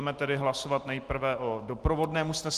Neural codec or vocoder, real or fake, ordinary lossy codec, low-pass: none; real; Opus, 24 kbps; 14.4 kHz